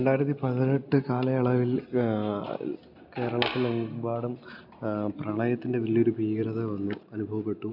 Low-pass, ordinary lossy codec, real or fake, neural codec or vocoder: 5.4 kHz; none; real; none